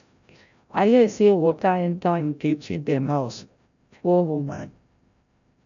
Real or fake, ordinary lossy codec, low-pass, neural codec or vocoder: fake; none; 7.2 kHz; codec, 16 kHz, 0.5 kbps, FreqCodec, larger model